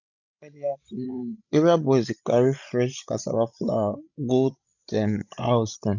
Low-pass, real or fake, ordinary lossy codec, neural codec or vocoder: 7.2 kHz; fake; none; codec, 16 kHz, 16 kbps, FreqCodec, larger model